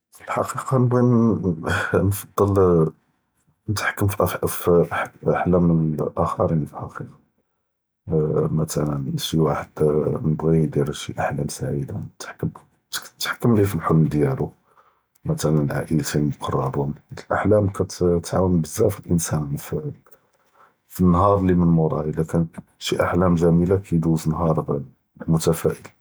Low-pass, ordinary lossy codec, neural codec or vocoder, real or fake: none; none; none; real